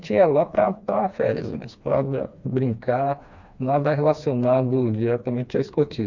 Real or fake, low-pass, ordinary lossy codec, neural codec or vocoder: fake; 7.2 kHz; Opus, 64 kbps; codec, 16 kHz, 2 kbps, FreqCodec, smaller model